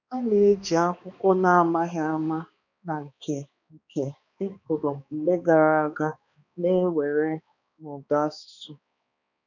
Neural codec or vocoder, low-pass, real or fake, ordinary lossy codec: codec, 16 kHz, 2 kbps, X-Codec, HuBERT features, trained on balanced general audio; 7.2 kHz; fake; none